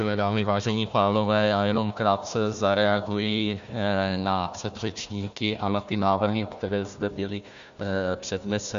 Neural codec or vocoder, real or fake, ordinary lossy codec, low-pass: codec, 16 kHz, 1 kbps, FunCodec, trained on Chinese and English, 50 frames a second; fake; MP3, 64 kbps; 7.2 kHz